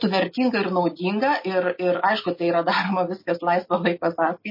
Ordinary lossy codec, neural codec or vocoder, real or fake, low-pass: MP3, 24 kbps; none; real; 5.4 kHz